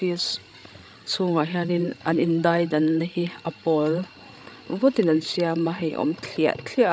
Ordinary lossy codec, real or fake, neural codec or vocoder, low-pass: none; fake; codec, 16 kHz, 16 kbps, FreqCodec, larger model; none